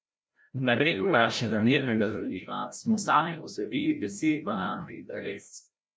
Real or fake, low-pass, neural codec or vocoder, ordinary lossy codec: fake; none; codec, 16 kHz, 0.5 kbps, FreqCodec, larger model; none